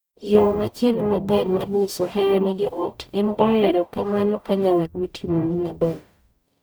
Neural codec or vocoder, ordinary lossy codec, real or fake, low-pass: codec, 44.1 kHz, 0.9 kbps, DAC; none; fake; none